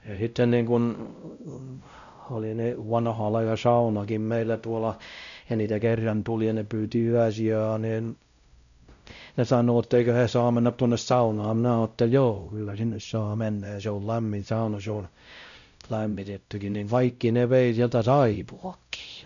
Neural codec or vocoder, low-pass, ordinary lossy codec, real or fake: codec, 16 kHz, 0.5 kbps, X-Codec, WavLM features, trained on Multilingual LibriSpeech; 7.2 kHz; none; fake